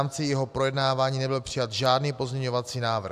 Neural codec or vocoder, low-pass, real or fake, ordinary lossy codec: none; 14.4 kHz; real; AAC, 96 kbps